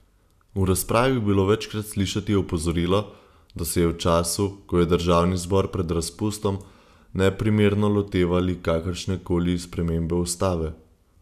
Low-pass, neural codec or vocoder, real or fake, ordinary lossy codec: 14.4 kHz; none; real; none